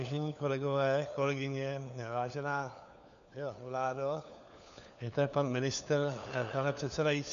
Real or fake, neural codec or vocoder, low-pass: fake; codec, 16 kHz, 4 kbps, FunCodec, trained on LibriTTS, 50 frames a second; 7.2 kHz